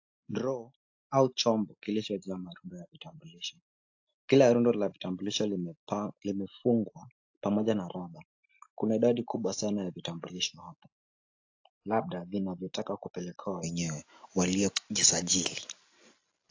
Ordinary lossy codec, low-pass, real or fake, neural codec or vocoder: AAC, 48 kbps; 7.2 kHz; real; none